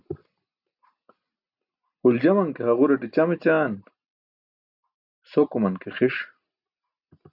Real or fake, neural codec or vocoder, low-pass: real; none; 5.4 kHz